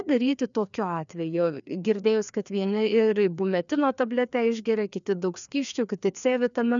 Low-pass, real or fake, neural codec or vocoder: 7.2 kHz; fake; codec, 16 kHz, 2 kbps, FreqCodec, larger model